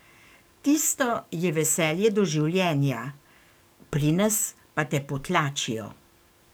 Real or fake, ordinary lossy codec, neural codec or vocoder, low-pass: fake; none; codec, 44.1 kHz, 7.8 kbps, DAC; none